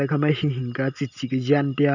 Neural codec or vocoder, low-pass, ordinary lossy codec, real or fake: none; 7.2 kHz; none; real